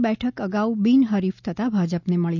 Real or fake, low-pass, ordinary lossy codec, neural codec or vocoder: real; 7.2 kHz; none; none